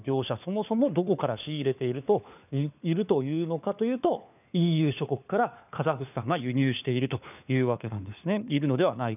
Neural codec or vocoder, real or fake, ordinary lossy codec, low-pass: codec, 16 kHz, 4 kbps, FunCodec, trained on LibriTTS, 50 frames a second; fake; none; 3.6 kHz